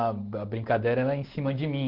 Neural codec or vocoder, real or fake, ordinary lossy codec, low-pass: none; real; Opus, 16 kbps; 5.4 kHz